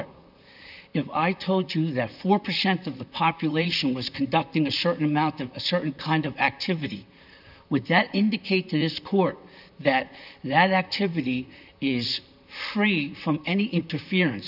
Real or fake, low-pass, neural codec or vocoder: fake; 5.4 kHz; vocoder, 44.1 kHz, 128 mel bands, Pupu-Vocoder